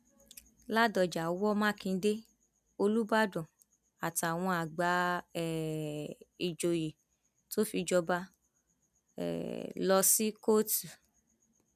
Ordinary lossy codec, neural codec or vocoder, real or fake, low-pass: none; none; real; 14.4 kHz